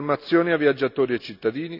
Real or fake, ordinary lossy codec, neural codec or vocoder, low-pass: real; none; none; 5.4 kHz